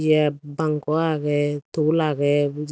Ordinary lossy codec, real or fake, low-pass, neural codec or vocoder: none; real; none; none